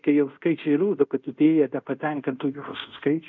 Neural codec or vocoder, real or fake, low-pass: codec, 24 kHz, 0.5 kbps, DualCodec; fake; 7.2 kHz